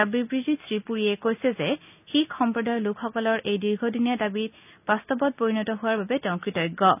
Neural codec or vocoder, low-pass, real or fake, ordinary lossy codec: none; 3.6 kHz; real; none